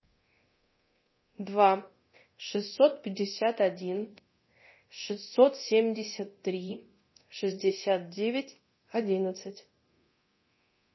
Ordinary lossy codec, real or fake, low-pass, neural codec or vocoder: MP3, 24 kbps; fake; 7.2 kHz; codec, 24 kHz, 0.9 kbps, DualCodec